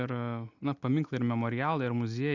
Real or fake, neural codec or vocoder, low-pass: real; none; 7.2 kHz